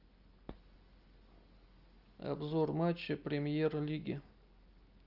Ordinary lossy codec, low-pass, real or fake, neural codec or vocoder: Opus, 24 kbps; 5.4 kHz; real; none